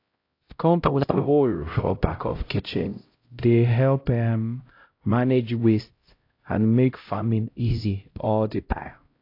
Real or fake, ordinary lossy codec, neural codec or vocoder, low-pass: fake; AAC, 32 kbps; codec, 16 kHz, 0.5 kbps, X-Codec, HuBERT features, trained on LibriSpeech; 5.4 kHz